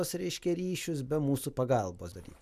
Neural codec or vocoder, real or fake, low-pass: none; real; 14.4 kHz